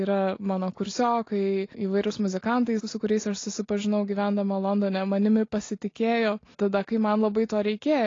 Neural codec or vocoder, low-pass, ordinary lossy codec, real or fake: none; 7.2 kHz; AAC, 32 kbps; real